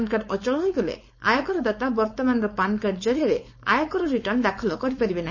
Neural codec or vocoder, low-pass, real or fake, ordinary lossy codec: codec, 16 kHz, 4.8 kbps, FACodec; 7.2 kHz; fake; MP3, 32 kbps